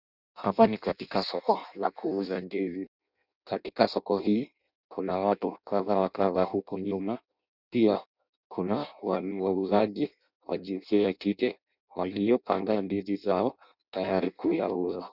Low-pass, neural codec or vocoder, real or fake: 5.4 kHz; codec, 16 kHz in and 24 kHz out, 0.6 kbps, FireRedTTS-2 codec; fake